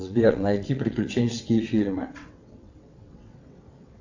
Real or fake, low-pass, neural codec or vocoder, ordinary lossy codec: fake; 7.2 kHz; vocoder, 22.05 kHz, 80 mel bands, WaveNeXt; AAC, 48 kbps